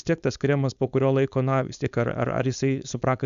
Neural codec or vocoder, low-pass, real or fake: codec, 16 kHz, 4.8 kbps, FACodec; 7.2 kHz; fake